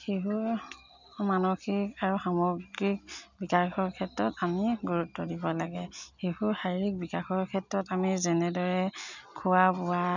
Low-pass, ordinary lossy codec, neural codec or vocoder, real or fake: 7.2 kHz; none; none; real